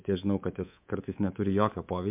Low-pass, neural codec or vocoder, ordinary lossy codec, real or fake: 3.6 kHz; codec, 24 kHz, 3.1 kbps, DualCodec; MP3, 32 kbps; fake